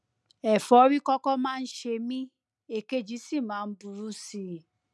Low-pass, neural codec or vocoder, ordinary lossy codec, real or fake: none; none; none; real